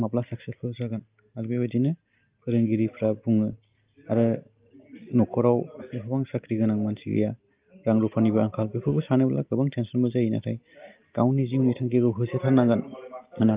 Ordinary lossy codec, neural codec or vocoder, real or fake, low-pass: Opus, 24 kbps; none; real; 3.6 kHz